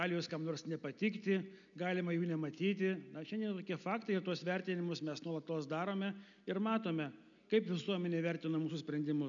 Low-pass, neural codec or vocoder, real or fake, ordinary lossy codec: 7.2 kHz; none; real; MP3, 96 kbps